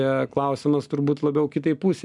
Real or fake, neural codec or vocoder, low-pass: real; none; 10.8 kHz